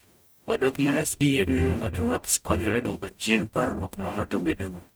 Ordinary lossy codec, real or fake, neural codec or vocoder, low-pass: none; fake; codec, 44.1 kHz, 0.9 kbps, DAC; none